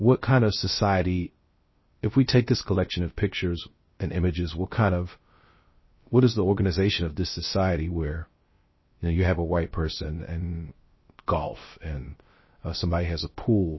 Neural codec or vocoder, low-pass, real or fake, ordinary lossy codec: codec, 16 kHz, 0.3 kbps, FocalCodec; 7.2 kHz; fake; MP3, 24 kbps